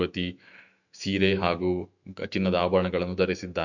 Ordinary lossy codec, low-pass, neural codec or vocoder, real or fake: none; 7.2 kHz; autoencoder, 48 kHz, 128 numbers a frame, DAC-VAE, trained on Japanese speech; fake